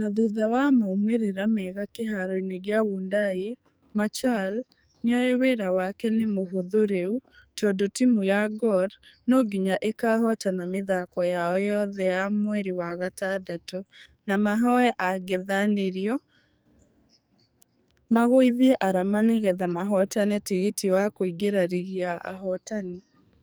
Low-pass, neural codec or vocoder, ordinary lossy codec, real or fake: none; codec, 44.1 kHz, 2.6 kbps, SNAC; none; fake